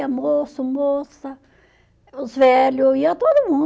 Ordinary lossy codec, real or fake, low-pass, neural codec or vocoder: none; real; none; none